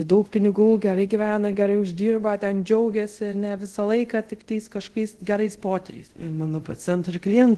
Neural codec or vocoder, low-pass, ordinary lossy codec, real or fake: codec, 24 kHz, 0.5 kbps, DualCodec; 10.8 kHz; Opus, 16 kbps; fake